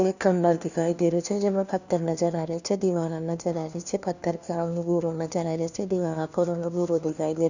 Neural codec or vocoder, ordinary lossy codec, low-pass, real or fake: codec, 16 kHz, 1 kbps, FunCodec, trained on LibriTTS, 50 frames a second; none; 7.2 kHz; fake